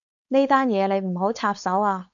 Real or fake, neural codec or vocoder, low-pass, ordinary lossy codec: fake; codec, 16 kHz, 4.8 kbps, FACodec; 7.2 kHz; MP3, 96 kbps